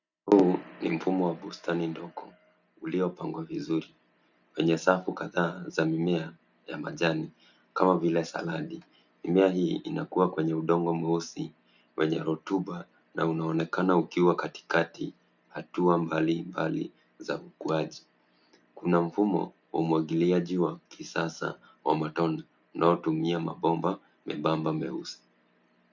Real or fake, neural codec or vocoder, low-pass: real; none; 7.2 kHz